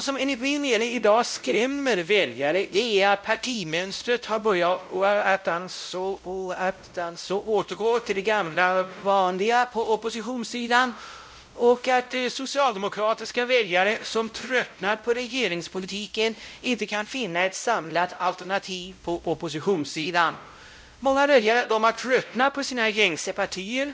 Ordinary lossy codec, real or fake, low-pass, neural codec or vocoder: none; fake; none; codec, 16 kHz, 0.5 kbps, X-Codec, WavLM features, trained on Multilingual LibriSpeech